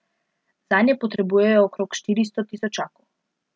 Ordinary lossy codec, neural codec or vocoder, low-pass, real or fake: none; none; none; real